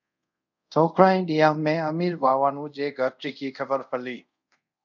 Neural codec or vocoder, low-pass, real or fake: codec, 24 kHz, 0.5 kbps, DualCodec; 7.2 kHz; fake